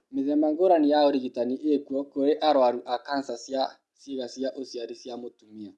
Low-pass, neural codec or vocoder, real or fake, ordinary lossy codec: none; none; real; none